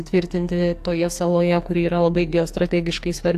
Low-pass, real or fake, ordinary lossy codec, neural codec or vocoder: 14.4 kHz; fake; AAC, 64 kbps; codec, 44.1 kHz, 2.6 kbps, SNAC